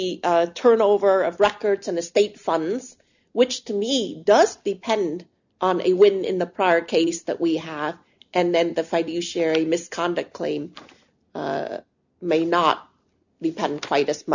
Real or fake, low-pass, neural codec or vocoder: real; 7.2 kHz; none